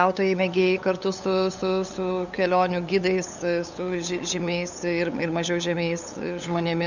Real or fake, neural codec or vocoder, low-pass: fake; codec, 16 kHz, 8 kbps, FunCodec, trained on LibriTTS, 25 frames a second; 7.2 kHz